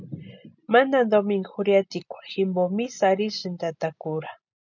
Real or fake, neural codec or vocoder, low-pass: real; none; 7.2 kHz